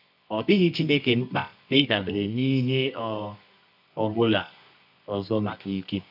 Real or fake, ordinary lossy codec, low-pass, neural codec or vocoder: fake; none; 5.4 kHz; codec, 24 kHz, 0.9 kbps, WavTokenizer, medium music audio release